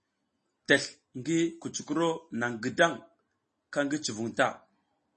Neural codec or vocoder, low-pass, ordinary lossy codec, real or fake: none; 10.8 kHz; MP3, 32 kbps; real